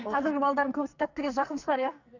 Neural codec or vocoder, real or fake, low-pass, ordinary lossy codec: codec, 44.1 kHz, 2.6 kbps, SNAC; fake; 7.2 kHz; none